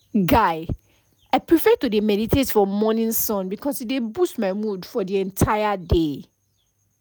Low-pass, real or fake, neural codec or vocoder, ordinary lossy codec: none; real; none; none